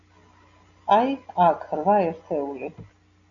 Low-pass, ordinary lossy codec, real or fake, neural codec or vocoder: 7.2 kHz; MP3, 96 kbps; real; none